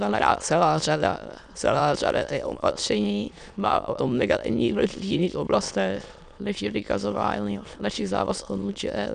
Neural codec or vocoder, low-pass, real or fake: autoencoder, 22.05 kHz, a latent of 192 numbers a frame, VITS, trained on many speakers; 9.9 kHz; fake